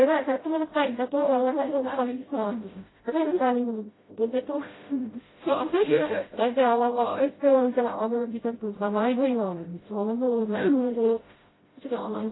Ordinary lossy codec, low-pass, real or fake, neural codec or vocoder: AAC, 16 kbps; 7.2 kHz; fake; codec, 16 kHz, 0.5 kbps, FreqCodec, smaller model